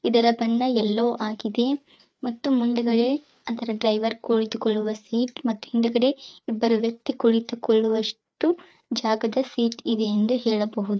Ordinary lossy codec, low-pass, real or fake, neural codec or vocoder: none; none; fake; codec, 16 kHz, 4 kbps, FreqCodec, larger model